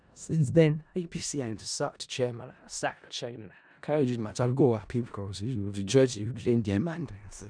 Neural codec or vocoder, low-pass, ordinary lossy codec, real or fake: codec, 16 kHz in and 24 kHz out, 0.4 kbps, LongCat-Audio-Codec, four codebook decoder; 9.9 kHz; none; fake